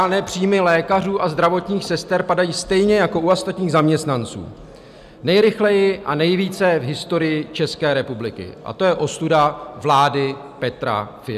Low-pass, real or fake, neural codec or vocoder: 14.4 kHz; real; none